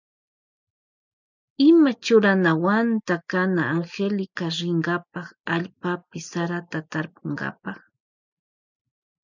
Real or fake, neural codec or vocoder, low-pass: real; none; 7.2 kHz